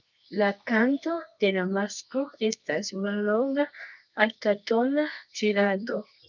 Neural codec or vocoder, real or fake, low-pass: codec, 24 kHz, 0.9 kbps, WavTokenizer, medium music audio release; fake; 7.2 kHz